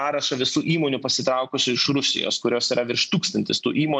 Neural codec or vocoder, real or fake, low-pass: none; real; 9.9 kHz